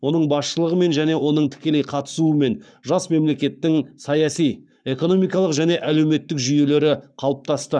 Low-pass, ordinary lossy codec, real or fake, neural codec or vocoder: 9.9 kHz; none; fake; codec, 44.1 kHz, 7.8 kbps, Pupu-Codec